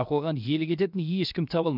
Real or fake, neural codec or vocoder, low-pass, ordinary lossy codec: fake; codec, 16 kHz, 0.7 kbps, FocalCodec; 5.4 kHz; none